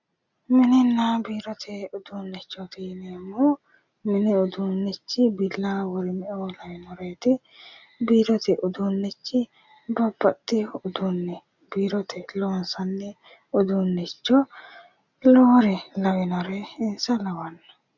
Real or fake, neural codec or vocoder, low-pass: real; none; 7.2 kHz